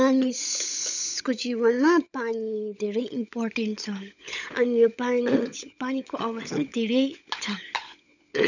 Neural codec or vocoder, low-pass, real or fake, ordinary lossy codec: codec, 16 kHz, 16 kbps, FunCodec, trained on LibriTTS, 50 frames a second; 7.2 kHz; fake; none